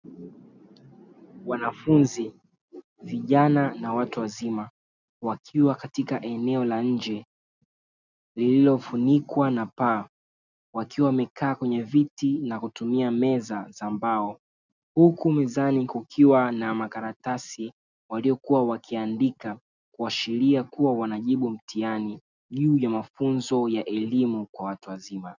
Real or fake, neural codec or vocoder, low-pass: real; none; 7.2 kHz